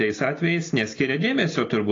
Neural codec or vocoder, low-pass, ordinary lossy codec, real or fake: none; 7.2 kHz; AAC, 32 kbps; real